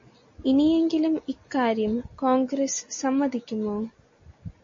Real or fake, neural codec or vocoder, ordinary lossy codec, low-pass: real; none; MP3, 32 kbps; 7.2 kHz